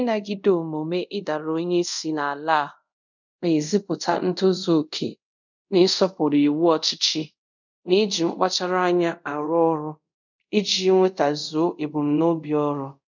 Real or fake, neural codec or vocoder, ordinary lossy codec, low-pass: fake; codec, 24 kHz, 0.5 kbps, DualCodec; none; 7.2 kHz